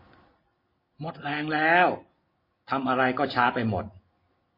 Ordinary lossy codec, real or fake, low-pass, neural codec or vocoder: MP3, 24 kbps; real; 5.4 kHz; none